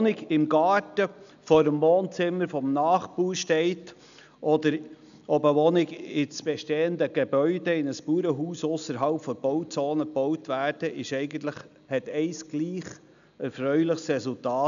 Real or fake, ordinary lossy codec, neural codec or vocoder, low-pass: real; none; none; 7.2 kHz